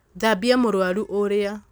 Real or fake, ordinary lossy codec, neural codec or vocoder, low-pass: real; none; none; none